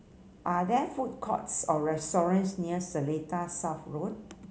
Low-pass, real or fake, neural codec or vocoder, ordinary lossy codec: none; real; none; none